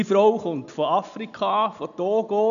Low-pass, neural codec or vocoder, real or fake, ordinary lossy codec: 7.2 kHz; none; real; none